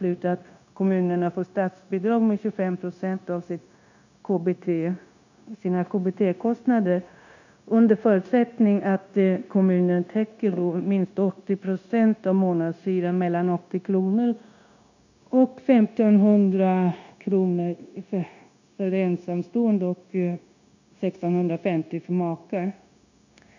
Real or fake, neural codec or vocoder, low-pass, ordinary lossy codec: fake; codec, 16 kHz, 0.9 kbps, LongCat-Audio-Codec; 7.2 kHz; none